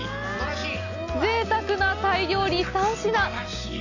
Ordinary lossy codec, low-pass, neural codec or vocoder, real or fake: none; 7.2 kHz; none; real